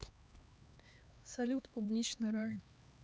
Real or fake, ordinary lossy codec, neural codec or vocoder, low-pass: fake; none; codec, 16 kHz, 2 kbps, X-Codec, HuBERT features, trained on LibriSpeech; none